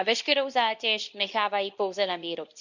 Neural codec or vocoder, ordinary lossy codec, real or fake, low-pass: codec, 24 kHz, 0.9 kbps, WavTokenizer, medium speech release version 2; none; fake; 7.2 kHz